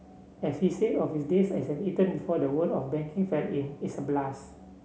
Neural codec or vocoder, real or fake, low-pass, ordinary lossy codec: none; real; none; none